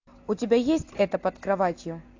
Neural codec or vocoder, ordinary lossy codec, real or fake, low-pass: none; AAC, 48 kbps; real; 7.2 kHz